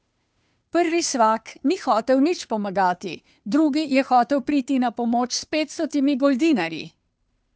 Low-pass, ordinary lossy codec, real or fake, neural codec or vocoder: none; none; fake; codec, 16 kHz, 2 kbps, FunCodec, trained on Chinese and English, 25 frames a second